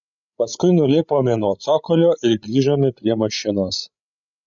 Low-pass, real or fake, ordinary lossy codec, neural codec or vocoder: 7.2 kHz; fake; MP3, 96 kbps; codec, 16 kHz, 16 kbps, FreqCodec, larger model